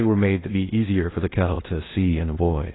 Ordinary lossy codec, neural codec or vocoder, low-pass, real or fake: AAC, 16 kbps; codec, 16 kHz in and 24 kHz out, 0.6 kbps, FocalCodec, streaming, 2048 codes; 7.2 kHz; fake